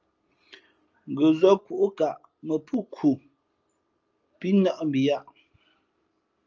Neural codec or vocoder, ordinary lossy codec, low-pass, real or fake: none; Opus, 24 kbps; 7.2 kHz; real